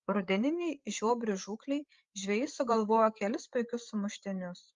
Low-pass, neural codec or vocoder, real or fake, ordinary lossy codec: 7.2 kHz; codec, 16 kHz, 8 kbps, FreqCodec, larger model; fake; Opus, 32 kbps